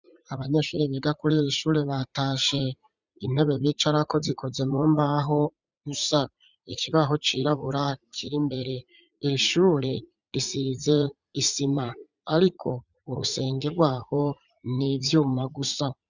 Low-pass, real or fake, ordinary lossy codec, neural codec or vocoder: 7.2 kHz; fake; Opus, 64 kbps; vocoder, 44.1 kHz, 80 mel bands, Vocos